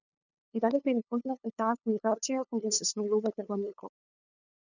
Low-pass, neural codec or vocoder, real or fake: 7.2 kHz; codec, 16 kHz, 2 kbps, FunCodec, trained on LibriTTS, 25 frames a second; fake